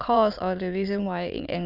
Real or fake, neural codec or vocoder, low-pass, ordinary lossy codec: fake; autoencoder, 22.05 kHz, a latent of 192 numbers a frame, VITS, trained on many speakers; 5.4 kHz; none